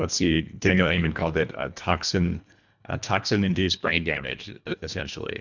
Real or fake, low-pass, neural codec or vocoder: fake; 7.2 kHz; codec, 24 kHz, 1.5 kbps, HILCodec